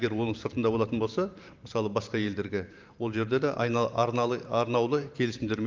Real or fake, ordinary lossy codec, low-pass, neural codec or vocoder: fake; Opus, 24 kbps; 7.2 kHz; vocoder, 44.1 kHz, 128 mel bands every 512 samples, BigVGAN v2